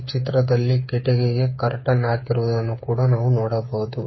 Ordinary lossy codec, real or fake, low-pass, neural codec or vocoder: MP3, 24 kbps; fake; 7.2 kHz; codec, 16 kHz, 8 kbps, FreqCodec, smaller model